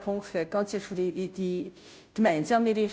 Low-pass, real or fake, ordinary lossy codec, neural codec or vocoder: none; fake; none; codec, 16 kHz, 0.5 kbps, FunCodec, trained on Chinese and English, 25 frames a second